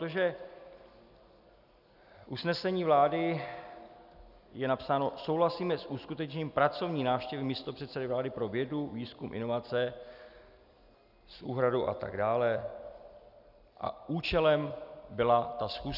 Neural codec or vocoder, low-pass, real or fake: none; 5.4 kHz; real